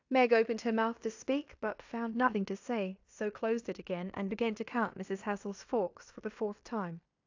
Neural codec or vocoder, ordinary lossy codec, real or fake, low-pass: codec, 16 kHz in and 24 kHz out, 0.9 kbps, LongCat-Audio-Codec, fine tuned four codebook decoder; AAC, 48 kbps; fake; 7.2 kHz